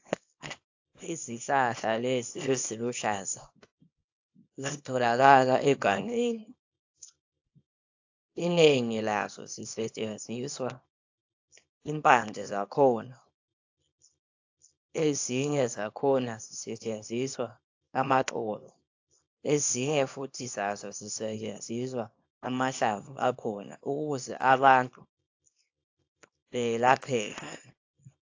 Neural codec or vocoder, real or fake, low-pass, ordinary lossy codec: codec, 24 kHz, 0.9 kbps, WavTokenizer, small release; fake; 7.2 kHz; AAC, 48 kbps